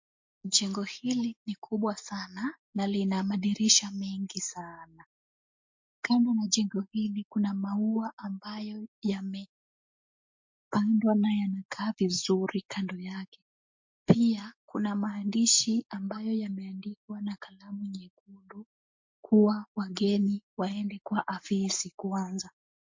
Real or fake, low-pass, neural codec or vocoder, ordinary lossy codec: real; 7.2 kHz; none; MP3, 48 kbps